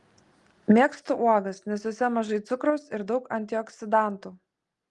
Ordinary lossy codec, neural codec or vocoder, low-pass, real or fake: Opus, 24 kbps; none; 10.8 kHz; real